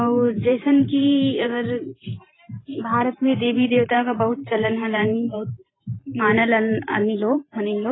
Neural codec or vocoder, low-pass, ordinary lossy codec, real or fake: vocoder, 44.1 kHz, 128 mel bands every 512 samples, BigVGAN v2; 7.2 kHz; AAC, 16 kbps; fake